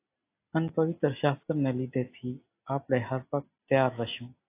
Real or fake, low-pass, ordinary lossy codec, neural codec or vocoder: real; 3.6 kHz; AAC, 24 kbps; none